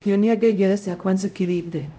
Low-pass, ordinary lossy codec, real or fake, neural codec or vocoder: none; none; fake; codec, 16 kHz, 0.5 kbps, X-Codec, HuBERT features, trained on LibriSpeech